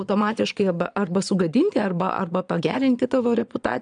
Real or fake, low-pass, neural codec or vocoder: fake; 9.9 kHz; vocoder, 22.05 kHz, 80 mel bands, Vocos